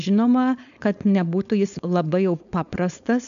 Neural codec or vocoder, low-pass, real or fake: codec, 16 kHz, 4.8 kbps, FACodec; 7.2 kHz; fake